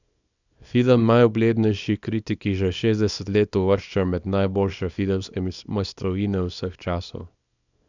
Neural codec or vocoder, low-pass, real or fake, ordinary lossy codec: codec, 24 kHz, 0.9 kbps, WavTokenizer, small release; 7.2 kHz; fake; none